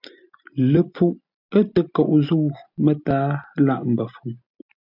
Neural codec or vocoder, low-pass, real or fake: none; 5.4 kHz; real